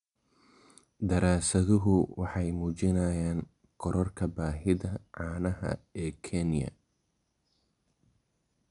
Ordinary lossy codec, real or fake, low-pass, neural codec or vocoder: none; real; 10.8 kHz; none